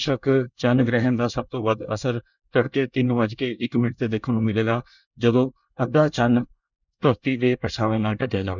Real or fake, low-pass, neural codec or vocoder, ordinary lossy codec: fake; 7.2 kHz; codec, 24 kHz, 1 kbps, SNAC; none